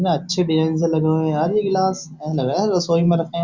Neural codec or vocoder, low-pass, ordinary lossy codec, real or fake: none; 7.2 kHz; Opus, 64 kbps; real